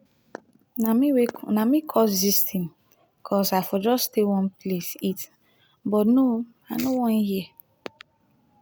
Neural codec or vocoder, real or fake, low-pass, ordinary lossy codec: none; real; none; none